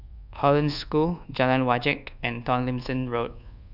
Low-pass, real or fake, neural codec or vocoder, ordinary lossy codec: 5.4 kHz; fake; codec, 24 kHz, 1.2 kbps, DualCodec; none